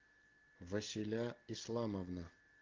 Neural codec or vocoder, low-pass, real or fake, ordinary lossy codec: none; 7.2 kHz; real; Opus, 16 kbps